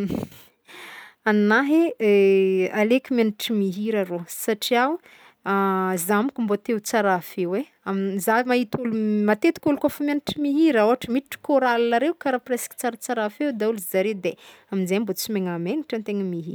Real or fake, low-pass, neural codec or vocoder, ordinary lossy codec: real; none; none; none